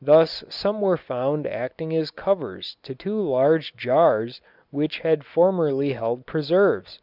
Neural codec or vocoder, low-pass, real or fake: none; 5.4 kHz; real